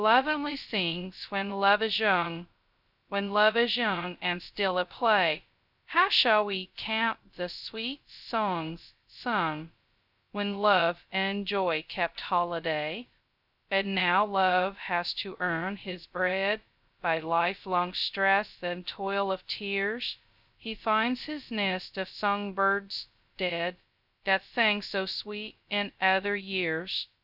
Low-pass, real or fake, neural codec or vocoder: 5.4 kHz; fake; codec, 16 kHz, 0.2 kbps, FocalCodec